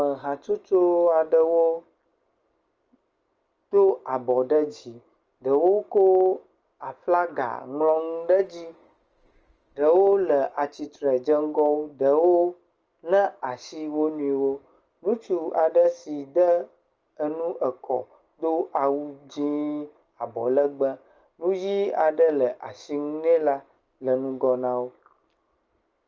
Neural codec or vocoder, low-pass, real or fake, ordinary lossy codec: none; 7.2 kHz; real; Opus, 24 kbps